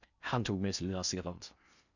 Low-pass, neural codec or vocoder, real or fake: 7.2 kHz; codec, 16 kHz in and 24 kHz out, 0.6 kbps, FocalCodec, streaming, 4096 codes; fake